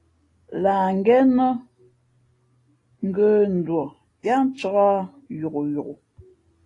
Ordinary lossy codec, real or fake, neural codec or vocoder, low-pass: AAC, 32 kbps; real; none; 10.8 kHz